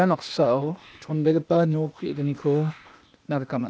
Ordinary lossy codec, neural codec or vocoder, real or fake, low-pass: none; codec, 16 kHz, 0.8 kbps, ZipCodec; fake; none